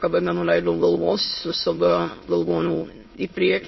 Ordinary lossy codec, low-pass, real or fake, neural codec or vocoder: MP3, 24 kbps; 7.2 kHz; fake; autoencoder, 22.05 kHz, a latent of 192 numbers a frame, VITS, trained on many speakers